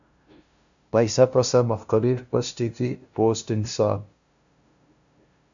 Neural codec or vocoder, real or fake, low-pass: codec, 16 kHz, 0.5 kbps, FunCodec, trained on LibriTTS, 25 frames a second; fake; 7.2 kHz